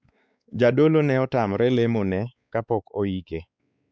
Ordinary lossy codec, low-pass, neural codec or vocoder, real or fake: none; none; codec, 16 kHz, 4 kbps, X-Codec, WavLM features, trained on Multilingual LibriSpeech; fake